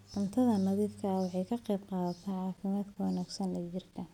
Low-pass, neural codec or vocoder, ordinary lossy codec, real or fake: 19.8 kHz; none; none; real